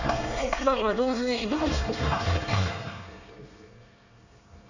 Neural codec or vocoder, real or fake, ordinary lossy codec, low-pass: codec, 24 kHz, 1 kbps, SNAC; fake; none; 7.2 kHz